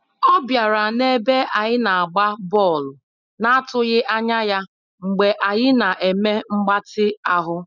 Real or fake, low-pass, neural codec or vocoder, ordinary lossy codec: real; 7.2 kHz; none; none